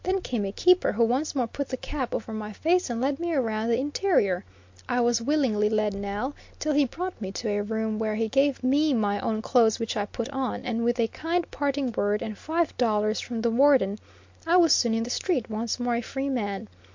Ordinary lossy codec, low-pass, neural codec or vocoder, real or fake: MP3, 48 kbps; 7.2 kHz; none; real